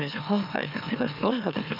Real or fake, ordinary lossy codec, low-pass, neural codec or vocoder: fake; none; 5.4 kHz; autoencoder, 44.1 kHz, a latent of 192 numbers a frame, MeloTTS